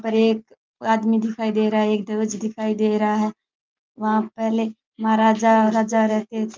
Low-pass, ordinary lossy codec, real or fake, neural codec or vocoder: 7.2 kHz; Opus, 24 kbps; real; none